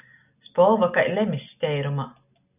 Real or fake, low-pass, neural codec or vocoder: real; 3.6 kHz; none